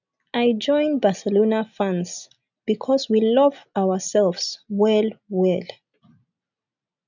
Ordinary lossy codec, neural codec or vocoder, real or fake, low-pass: none; none; real; 7.2 kHz